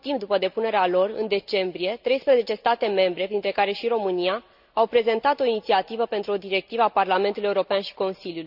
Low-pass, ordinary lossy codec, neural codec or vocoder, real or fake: 5.4 kHz; none; none; real